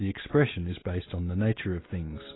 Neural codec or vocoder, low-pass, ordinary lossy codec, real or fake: none; 7.2 kHz; AAC, 16 kbps; real